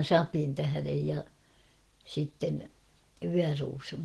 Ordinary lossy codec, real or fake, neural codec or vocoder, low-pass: Opus, 16 kbps; real; none; 10.8 kHz